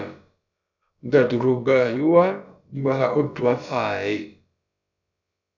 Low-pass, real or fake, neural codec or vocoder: 7.2 kHz; fake; codec, 16 kHz, about 1 kbps, DyCAST, with the encoder's durations